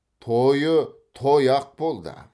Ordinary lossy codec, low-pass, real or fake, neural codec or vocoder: none; none; real; none